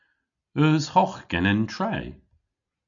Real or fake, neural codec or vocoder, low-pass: real; none; 7.2 kHz